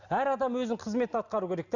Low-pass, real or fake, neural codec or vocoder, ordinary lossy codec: 7.2 kHz; real; none; none